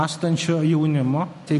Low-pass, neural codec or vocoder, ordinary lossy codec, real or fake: 14.4 kHz; none; MP3, 48 kbps; real